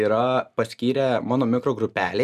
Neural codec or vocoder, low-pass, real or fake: none; 14.4 kHz; real